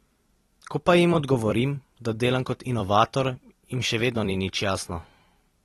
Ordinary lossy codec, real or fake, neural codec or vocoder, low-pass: AAC, 32 kbps; fake; vocoder, 44.1 kHz, 128 mel bands every 256 samples, BigVGAN v2; 19.8 kHz